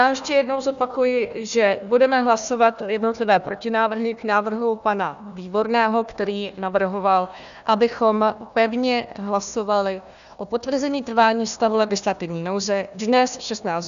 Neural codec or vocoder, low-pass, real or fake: codec, 16 kHz, 1 kbps, FunCodec, trained on Chinese and English, 50 frames a second; 7.2 kHz; fake